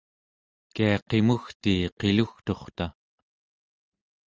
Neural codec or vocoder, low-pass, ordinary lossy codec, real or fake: none; 7.2 kHz; Opus, 24 kbps; real